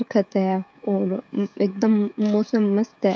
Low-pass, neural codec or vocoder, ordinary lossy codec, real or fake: none; codec, 16 kHz, 16 kbps, FreqCodec, smaller model; none; fake